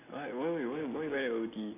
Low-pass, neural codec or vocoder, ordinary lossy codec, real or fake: 3.6 kHz; none; AAC, 32 kbps; real